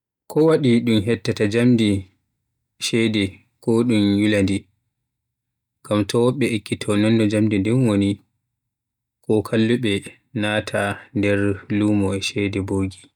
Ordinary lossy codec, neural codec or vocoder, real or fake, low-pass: none; none; real; 19.8 kHz